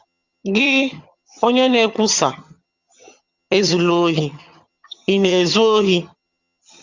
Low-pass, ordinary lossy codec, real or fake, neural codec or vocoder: 7.2 kHz; Opus, 64 kbps; fake; vocoder, 22.05 kHz, 80 mel bands, HiFi-GAN